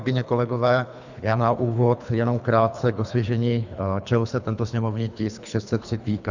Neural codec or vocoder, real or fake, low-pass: codec, 24 kHz, 3 kbps, HILCodec; fake; 7.2 kHz